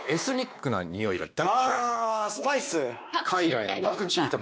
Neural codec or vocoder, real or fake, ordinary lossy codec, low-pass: codec, 16 kHz, 2 kbps, X-Codec, WavLM features, trained on Multilingual LibriSpeech; fake; none; none